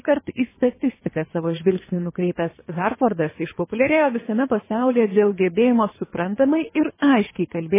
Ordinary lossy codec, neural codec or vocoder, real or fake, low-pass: MP3, 16 kbps; codec, 24 kHz, 3 kbps, HILCodec; fake; 3.6 kHz